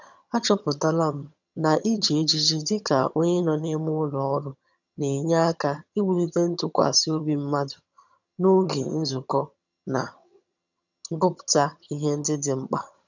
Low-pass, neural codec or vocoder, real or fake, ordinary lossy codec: 7.2 kHz; vocoder, 22.05 kHz, 80 mel bands, HiFi-GAN; fake; none